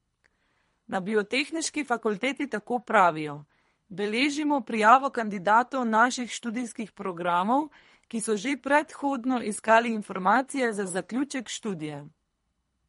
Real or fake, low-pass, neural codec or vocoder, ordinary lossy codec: fake; 10.8 kHz; codec, 24 kHz, 3 kbps, HILCodec; MP3, 48 kbps